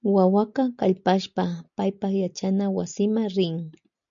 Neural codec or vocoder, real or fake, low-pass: none; real; 7.2 kHz